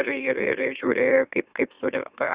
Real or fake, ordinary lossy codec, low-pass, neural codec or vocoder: fake; Opus, 64 kbps; 3.6 kHz; autoencoder, 44.1 kHz, a latent of 192 numbers a frame, MeloTTS